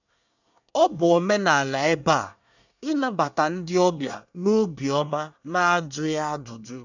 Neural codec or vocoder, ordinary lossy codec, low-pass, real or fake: codec, 24 kHz, 1 kbps, SNAC; none; 7.2 kHz; fake